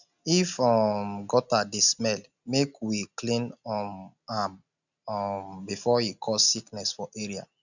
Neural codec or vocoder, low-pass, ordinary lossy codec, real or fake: none; 7.2 kHz; none; real